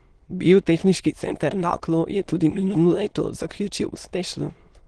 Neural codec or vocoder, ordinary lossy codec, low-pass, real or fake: autoencoder, 22.05 kHz, a latent of 192 numbers a frame, VITS, trained on many speakers; Opus, 16 kbps; 9.9 kHz; fake